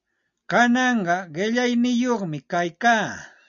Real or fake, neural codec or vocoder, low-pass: real; none; 7.2 kHz